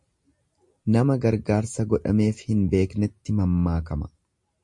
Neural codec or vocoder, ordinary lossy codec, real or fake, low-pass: none; MP3, 48 kbps; real; 10.8 kHz